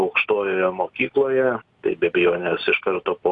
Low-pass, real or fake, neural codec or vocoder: 10.8 kHz; real; none